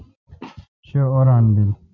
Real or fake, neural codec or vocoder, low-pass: real; none; 7.2 kHz